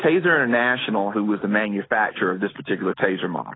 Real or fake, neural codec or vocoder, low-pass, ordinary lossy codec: real; none; 7.2 kHz; AAC, 16 kbps